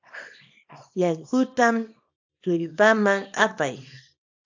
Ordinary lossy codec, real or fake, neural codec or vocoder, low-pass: AAC, 48 kbps; fake; codec, 24 kHz, 0.9 kbps, WavTokenizer, small release; 7.2 kHz